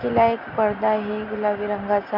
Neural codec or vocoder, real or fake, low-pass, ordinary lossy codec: none; real; 5.4 kHz; MP3, 48 kbps